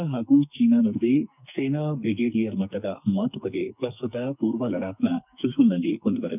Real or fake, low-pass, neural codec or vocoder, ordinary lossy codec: fake; 3.6 kHz; codec, 44.1 kHz, 2.6 kbps, SNAC; none